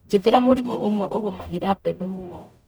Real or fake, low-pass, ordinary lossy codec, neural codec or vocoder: fake; none; none; codec, 44.1 kHz, 0.9 kbps, DAC